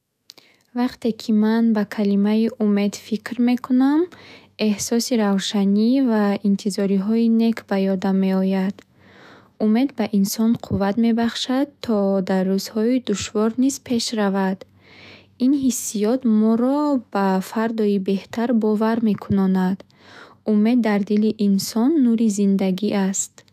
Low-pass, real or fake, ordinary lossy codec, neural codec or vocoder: 14.4 kHz; fake; none; autoencoder, 48 kHz, 128 numbers a frame, DAC-VAE, trained on Japanese speech